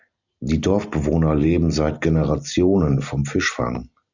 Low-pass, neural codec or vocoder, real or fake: 7.2 kHz; none; real